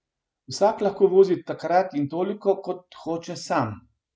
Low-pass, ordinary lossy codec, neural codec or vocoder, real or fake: none; none; none; real